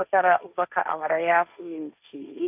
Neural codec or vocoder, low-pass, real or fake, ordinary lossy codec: codec, 16 kHz, 1.1 kbps, Voila-Tokenizer; 3.6 kHz; fake; none